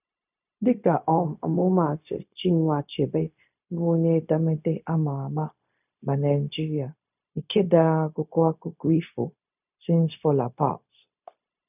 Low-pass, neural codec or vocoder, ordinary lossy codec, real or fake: 3.6 kHz; codec, 16 kHz, 0.4 kbps, LongCat-Audio-Codec; none; fake